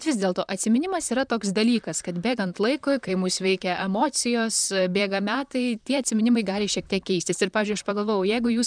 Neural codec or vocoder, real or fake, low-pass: vocoder, 44.1 kHz, 128 mel bands, Pupu-Vocoder; fake; 9.9 kHz